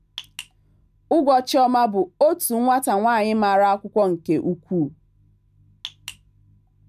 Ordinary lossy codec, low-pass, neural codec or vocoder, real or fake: none; 14.4 kHz; none; real